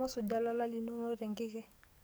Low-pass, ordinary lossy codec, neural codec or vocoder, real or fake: none; none; codec, 44.1 kHz, 7.8 kbps, DAC; fake